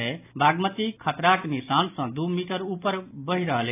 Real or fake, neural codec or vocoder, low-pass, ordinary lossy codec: real; none; 3.6 kHz; AAC, 24 kbps